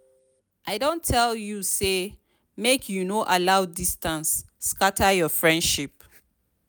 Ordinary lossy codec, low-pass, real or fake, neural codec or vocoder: none; none; real; none